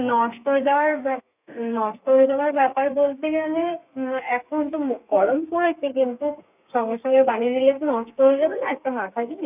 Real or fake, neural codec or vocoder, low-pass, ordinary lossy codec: fake; codec, 32 kHz, 1.9 kbps, SNAC; 3.6 kHz; none